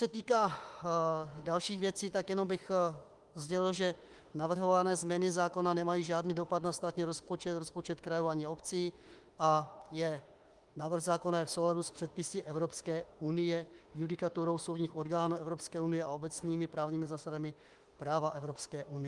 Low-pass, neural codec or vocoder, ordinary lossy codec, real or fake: 10.8 kHz; autoencoder, 48 kHz, 32 numbers a frame, DAC-VAE, trained on Japanese speech; Opus, 24 kbps; fake